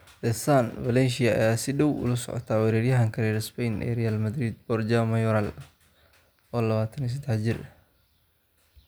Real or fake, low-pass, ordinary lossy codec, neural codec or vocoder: real; none; none; none